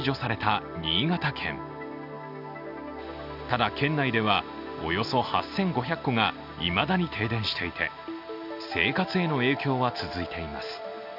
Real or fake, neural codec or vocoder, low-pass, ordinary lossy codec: real; none; 5.4 kHz; none